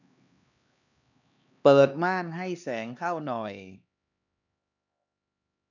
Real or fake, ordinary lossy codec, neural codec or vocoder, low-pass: fake; none; codec, 16 kHz, 2 kbps, X-Codec, HuBERT features, trained on LibriSpeech; 7.2 kHz